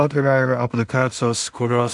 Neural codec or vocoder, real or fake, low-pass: codec, 16 kHz in and 24 kHz out, 0.4 kbps, LongCat-Audio-Codec, two codebook decoder; fake; 10.8 kHz